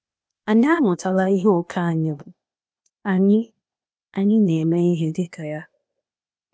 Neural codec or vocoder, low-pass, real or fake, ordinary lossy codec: codec, 16 kHz, 0.8 kbps, ZipCodec; none; fake; none